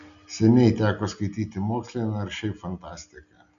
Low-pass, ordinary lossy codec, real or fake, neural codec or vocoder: 7.2 kHz; MP3, 96 kbps; real; none